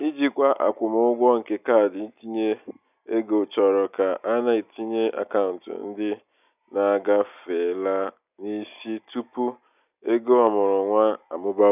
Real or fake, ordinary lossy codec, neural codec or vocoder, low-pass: real; none; none; 3.6 kHz